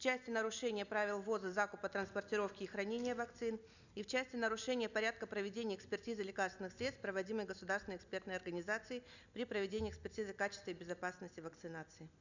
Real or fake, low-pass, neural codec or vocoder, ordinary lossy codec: real; 7.2 kHz; none; none